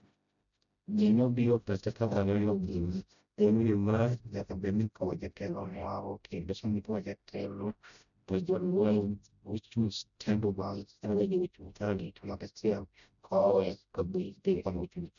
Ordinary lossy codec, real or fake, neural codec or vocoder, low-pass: none; fake; codec, 16 kHz, 0.5 kbps, FreqCodec, smaller model; 7.2 kHz